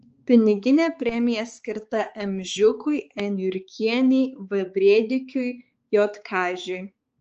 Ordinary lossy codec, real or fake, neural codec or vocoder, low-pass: Opus, 24 kbps; fake; codec, 16 kHz, 4 kbps, X-Codec, HuBERT features, trained on balanced general audio; 7.2 kHz